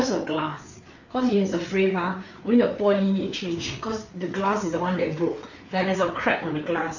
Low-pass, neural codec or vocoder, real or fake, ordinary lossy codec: 7.2 kHz; codec, 16 kHz, 4 kbps, FreqCodec, larger model; fake; none